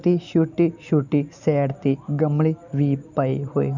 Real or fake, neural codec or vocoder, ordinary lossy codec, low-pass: real; none; none; 7.2 kHz